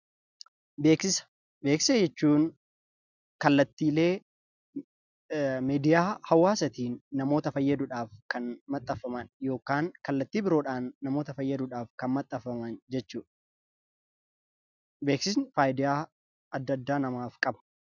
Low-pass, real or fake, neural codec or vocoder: 7.2 kHz; real; none